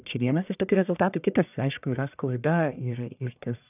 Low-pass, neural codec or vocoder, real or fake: 3.6 kHz; codec, 44.1 kHz, 1.7 kbps, Pupu-Codec; fake